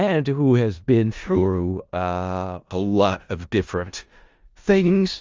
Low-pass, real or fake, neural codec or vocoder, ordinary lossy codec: 7.2 kHz; fake; codec, 16 kHz in and 24 kHz out, 0.4 kbps, LongCat-Audio-Codec, four codebook decoder; Opus, 32 kbps